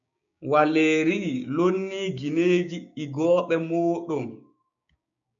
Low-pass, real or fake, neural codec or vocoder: 7.2 kHz; fake; codec, 16 kHz, 6 kbps, DAC